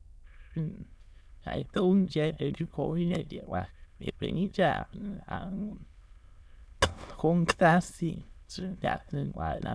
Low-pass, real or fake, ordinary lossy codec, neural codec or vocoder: none; fake; none; autoencoder, 22.05 kHz, a latent of 192 numbers a frame, VITS, trained on many speakers